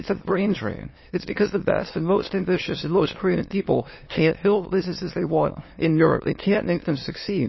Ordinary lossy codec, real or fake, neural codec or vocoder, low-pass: MP3, 24 kbps; fake; autoencoder, 22.05 kHz, a latent of 192 numbers a frame, VITS, trained on many speakers; 7.2 kHz